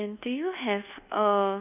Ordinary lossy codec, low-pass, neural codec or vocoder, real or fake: none; 3.6 kHz; codec, 24 kHz, 1.2 kbps, DualCodec; fake